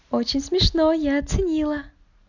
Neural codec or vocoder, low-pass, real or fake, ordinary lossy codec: none; 7.2 kHz; real; none